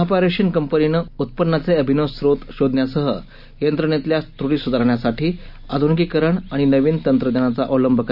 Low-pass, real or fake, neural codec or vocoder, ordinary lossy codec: 5.4 kHz; real; none; none